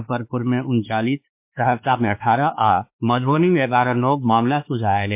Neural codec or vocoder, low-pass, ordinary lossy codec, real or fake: codec, 16 kHz, 2 kbps, X-Codec, WavLM features, trained on Multilingual LibriSpeech; 3.6 kHz; MP3, 32 kbps; fake